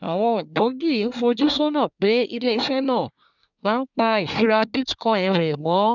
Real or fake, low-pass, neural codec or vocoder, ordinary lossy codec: fake; 7.2 kHz; codec, 24 kHz, 1 kbps, SNAC; none